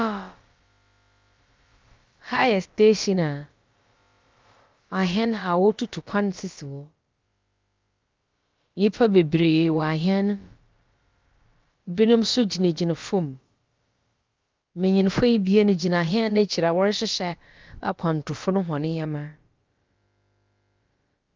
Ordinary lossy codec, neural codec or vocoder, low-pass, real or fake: Opus, 24 kbps; codec, 16 kHz, about 1 kbps, DyCAST, with the encoder's durations; 7.2 kHz; fake